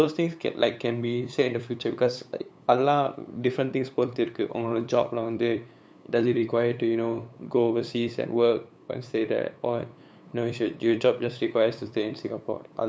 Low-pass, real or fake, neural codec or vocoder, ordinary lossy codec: none; fake; codec, 16 kHz, 8 kbps, FunCodec, trained on LibriTTS, 25 frames a second; none